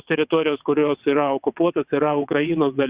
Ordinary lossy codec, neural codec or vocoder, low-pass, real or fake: Opus, 64 kbps; vocoder, 22.05 kHz, 80 mel bands, WaveNeXt; 3.6 kHz; fake